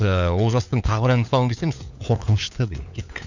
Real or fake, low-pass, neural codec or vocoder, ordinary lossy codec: fake; 7.2 kHz; codec, 16 kHz, 4 kbps, FunCodec, trained on LibriTTS, 50 frames a second; none